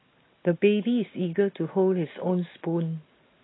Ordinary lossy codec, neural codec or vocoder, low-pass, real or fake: AAC, 16 kbps; none; 7.2 kHz; real